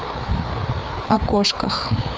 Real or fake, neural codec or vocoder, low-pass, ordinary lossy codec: fake; codec, 16 kHz, 4 kbps, FreqCodec, larger model; none; none